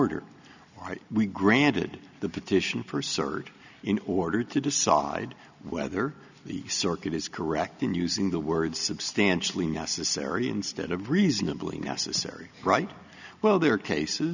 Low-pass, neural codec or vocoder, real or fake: 7.2 kHz; none; real